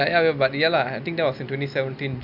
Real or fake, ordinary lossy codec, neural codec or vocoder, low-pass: real; none; none; 5.4 kHz